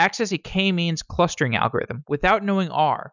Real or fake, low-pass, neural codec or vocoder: real; 7.2 kHz; none